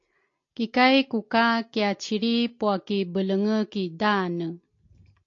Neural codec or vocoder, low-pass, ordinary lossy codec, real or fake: none; 7.2 kHz; AAC, 48 kbps; real